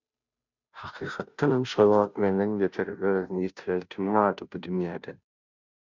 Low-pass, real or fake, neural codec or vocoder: 7.2 kHz; fake; codec, 16 kHz, 0.5 kbps, FunCodec, trained on Chinese and English, 25 frames a second